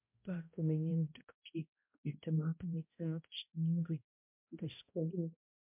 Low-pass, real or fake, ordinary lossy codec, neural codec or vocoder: 3.6 kHz; fake; MP3, 32 kbps; codec, 16 kHz, 0.5 kbps, X-Codec, HuBERT features, trained on balanced general audio